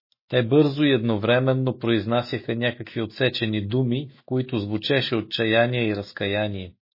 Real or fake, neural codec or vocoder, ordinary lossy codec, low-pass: fake; autoencoder, 48 kHz, 128 numbers a frame, DAC-VAE, trained on Japanese speech; MP3, 24 kbps; 5.4 kHz